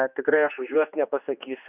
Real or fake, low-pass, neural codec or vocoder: fake; 3.6 kHz; codec, 16 kHz, 2 kbps, X-Codec, HuBERT features, trained on general audio